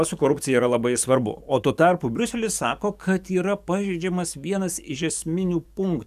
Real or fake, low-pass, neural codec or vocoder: fake; 14.4 kHz; codec, 44.1 kHz, 7.8 kbps, DAC